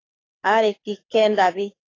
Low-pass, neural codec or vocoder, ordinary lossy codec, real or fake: 7.2 kHz; codec, 24 kHz, 6 kbps, HILCodec; AAC, 32 kbps; fake